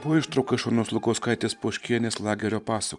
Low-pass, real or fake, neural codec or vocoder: 10.8 kHz; fake; vocoder, 44.1 kHz, 128 mel bands every 512 samples, BigVGAN v2